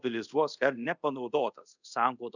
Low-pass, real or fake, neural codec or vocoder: 7.2 kHz; fake; codec, 24 kHz, 0.5 kbps, DualCodec